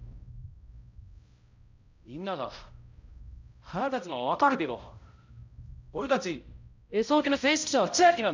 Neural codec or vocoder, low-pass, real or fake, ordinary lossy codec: codec, 16 kHz, 0.5 kbps, X-Codec, HuBERT features, trained on balanced general audio; 7.2 kHz; fake; MP3, 64 kbps